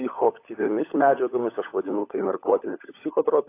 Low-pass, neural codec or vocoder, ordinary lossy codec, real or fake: 3.6 kHz; codec, 16 kHz, 16 kbps, FunCodec, trained on LibriTTS, 50 frames a second; AAC, 24 kbps; fake